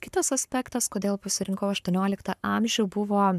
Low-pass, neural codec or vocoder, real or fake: 14.4 kHz; codec, 44.1 kHz, 7.8 kbps, Pupu-Codec; fake